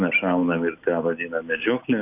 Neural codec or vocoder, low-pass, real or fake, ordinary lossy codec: none; 3.6 kHz; real; AAC, 32 kbps